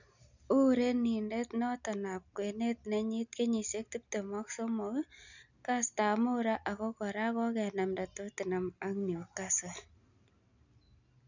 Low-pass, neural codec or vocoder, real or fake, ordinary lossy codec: 7.2 kHz; none; real; none